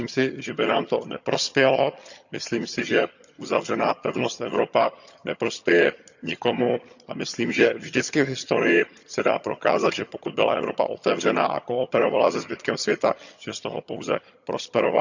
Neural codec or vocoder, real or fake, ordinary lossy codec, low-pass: vocoder, 22.05 kHz, 80 mel bands, HiFi-GAN; fake; none; 7.2 kHz